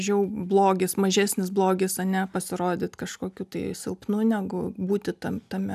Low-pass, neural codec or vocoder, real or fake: 14.4 kHz; none; real